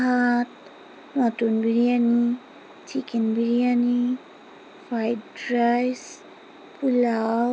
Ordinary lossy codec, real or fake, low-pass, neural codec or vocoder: none; real; none; none